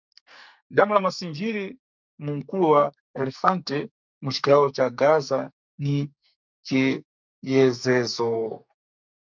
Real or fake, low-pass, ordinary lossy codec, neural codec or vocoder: fake; 7.2 kHz; MP3, 64 kbps; codec, 44.1 kHz, 2.6 kbps, SNAC